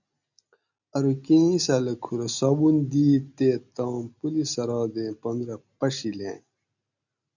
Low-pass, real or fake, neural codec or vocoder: 7.2 kHz; real; none